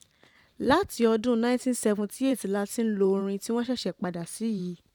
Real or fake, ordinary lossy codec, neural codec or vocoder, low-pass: fake; none; vocoder, 44.1 kHz, 128 mel bands every 512 samples, BigVGAN v2; 19.8 kHz